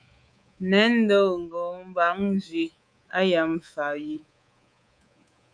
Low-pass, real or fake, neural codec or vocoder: 9.9 kHz; fake; codec, 24 kHz, 3.1 kbps, DualCodec